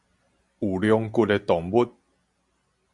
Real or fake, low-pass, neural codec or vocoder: real; 10.8 kHz; none